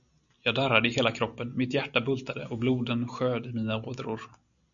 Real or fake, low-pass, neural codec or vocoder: real; 7.2 kHz; none